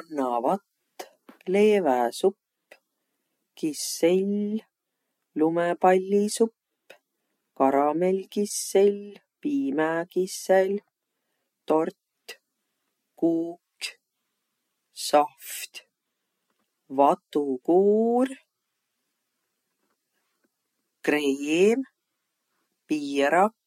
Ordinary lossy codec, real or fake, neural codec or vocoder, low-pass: MP3, 64 kbps; real; none; 19.8 kHz